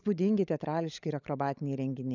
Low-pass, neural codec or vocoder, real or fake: 7.2 kHz; codec, 16 kHz, 16 kbps, FunCodec, trained on LibriTTS, 50 frames a second; fake